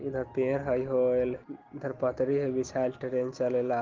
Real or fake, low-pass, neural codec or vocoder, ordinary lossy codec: real; 7.2 kHz; none; Opus, 16 kbps